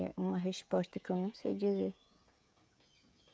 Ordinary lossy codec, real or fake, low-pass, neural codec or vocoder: none; fake; none; codec, 16 kHz, 16 kbps, FreqCodec, smaller model